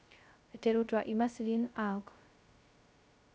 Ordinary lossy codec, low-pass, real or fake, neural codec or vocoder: none; none; fake; codec, 16 kHz, 0.2 kbps, FocalCodec